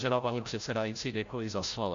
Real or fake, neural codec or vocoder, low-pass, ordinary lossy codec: fake; codec, 16 kHz, 0.5 kbps, FreqCodec, larger model; 7.2 kHz; AAC, 48 kbps